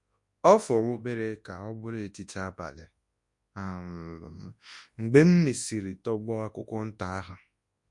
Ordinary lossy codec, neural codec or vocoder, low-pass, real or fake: MP3, 48 kbps; codec, 24 kHz, 0.9 kbps, WavTokenizer, large speech release; 10.8 kHz; fake